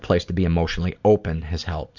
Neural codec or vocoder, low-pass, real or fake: none; 7.2 kHz; real